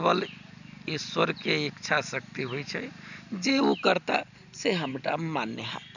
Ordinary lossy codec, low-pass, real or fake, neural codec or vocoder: none; 7.2 kHz; real; none